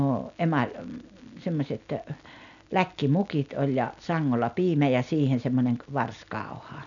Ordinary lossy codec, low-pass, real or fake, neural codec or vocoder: none; 7.2 kHz; real; none